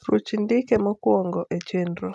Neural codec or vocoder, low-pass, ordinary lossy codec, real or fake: none; none; none; real